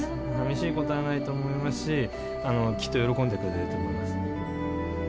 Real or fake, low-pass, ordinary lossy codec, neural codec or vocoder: real; none; none; none